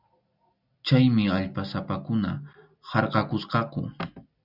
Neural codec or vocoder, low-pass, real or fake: none; 5.4 kHz; real